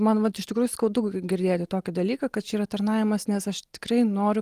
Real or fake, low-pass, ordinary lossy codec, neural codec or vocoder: real; 14.4 kHz; Opus, 24 kbps; none